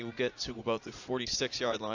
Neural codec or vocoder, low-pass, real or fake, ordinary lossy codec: vocoder, 44.1 kHz, 80 mel bands, Vocos; 7.2 kHz; fake; AAC, 48 kbps